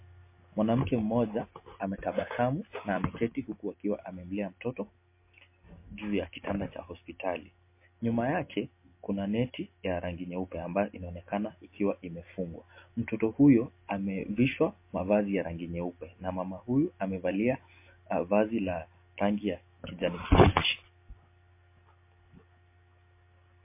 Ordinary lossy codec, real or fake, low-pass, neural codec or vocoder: MP3, 24 kbps; real; 3.6 kHz; none